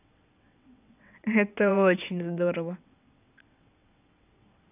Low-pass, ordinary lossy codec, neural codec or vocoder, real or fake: 3.6 kHz; none; vocoder, 22.05 kHz, 80 mel bands, WaveNeXt; fake